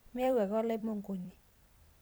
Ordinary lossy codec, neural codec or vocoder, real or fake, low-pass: none; vocoder, 44.1 kHz, 128 mel bands every 256 samples, BigVGAN v2; fake; none